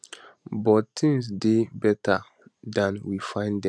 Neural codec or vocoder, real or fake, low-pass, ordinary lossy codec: none; real; none; none